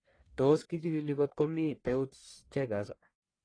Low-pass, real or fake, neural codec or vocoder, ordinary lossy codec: 9.9 kHz; fake; codec, 44.1 kHz, 1.7 kbps, Pupu-Codec; AAC, 32 kbps